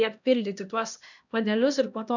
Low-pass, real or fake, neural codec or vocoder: 7.2 kHz; fake; codec, 16 kHz, 1 kbps, X-Codec, HuBERT features, trained on LibriSpeech